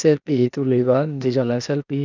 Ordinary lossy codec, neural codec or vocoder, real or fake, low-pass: none; codec, 16 kHz, 0.8 kbps, ZipCodec; fake; 7.2 kHz